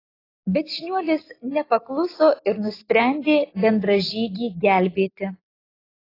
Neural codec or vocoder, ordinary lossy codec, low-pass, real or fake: none; AAC, 24 kbps; 5.4 kHz; real